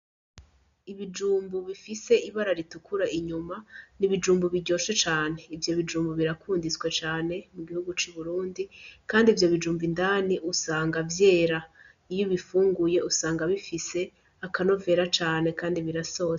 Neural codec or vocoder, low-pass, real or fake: none; 7.2 kHz; real